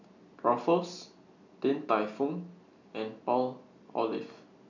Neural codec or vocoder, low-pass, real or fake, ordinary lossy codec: none; 7.2 kHz; real; MP3, 64 kbps